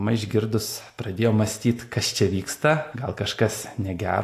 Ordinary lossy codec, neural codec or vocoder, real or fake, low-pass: AAC, 64 kbps; none; real; 14.4 kHz